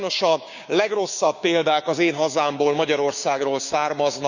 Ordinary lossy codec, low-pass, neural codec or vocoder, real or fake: none; 7.2 kHz; codec, 16 kHz, 6 kbps, DAC; fake